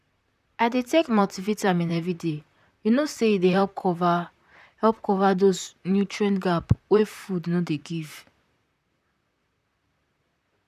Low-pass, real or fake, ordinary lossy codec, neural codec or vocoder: 14.4 kHz; fake; none; vocoder, 44.1 kHz, 128 mel bands, Pupu-Vocoder